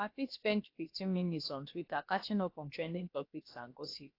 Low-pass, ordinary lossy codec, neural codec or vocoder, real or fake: 5.4 kHz; AAC, 32 kbps; codec, 16 kHz, about 1 kbps, DyCAST, with the encoder's durations; fake